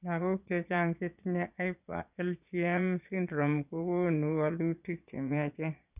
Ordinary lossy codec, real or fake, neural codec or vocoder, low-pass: none; fake; vocoder, 22.05 kHz, 80 mel bands, Vocos; 3.6 kHz